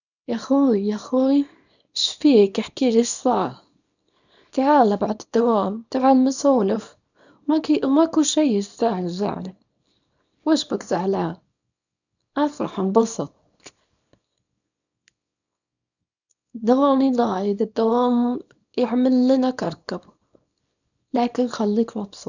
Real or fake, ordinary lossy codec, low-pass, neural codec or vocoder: fake; none; 7.2 kHz; codec, 24 kHz, 0.9 kbps, WavTokenizer, small release